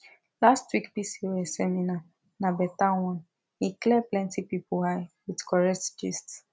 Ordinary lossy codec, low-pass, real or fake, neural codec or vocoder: none; none; real; none